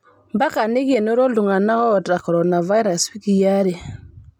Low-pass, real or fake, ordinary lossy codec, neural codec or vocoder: 19.8 kHz; real; MP3, 96 kbps; none